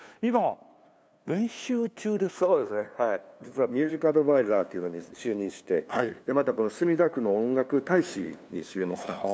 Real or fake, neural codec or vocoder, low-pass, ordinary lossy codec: fake; codec, 16 kHz, 2 kbps, FunCodec, trained on LibriTTS, 25 frames a second; none; none